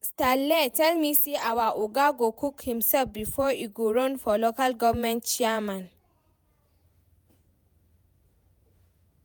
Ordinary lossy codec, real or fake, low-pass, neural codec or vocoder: none; fake; none; vocoder, 48 kHz, 128 mel bands, Vocos